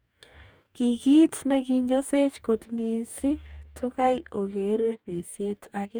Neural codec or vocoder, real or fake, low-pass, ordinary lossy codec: codec, 44.1 kHz, 2.6 kbps, DAC; fake; none; none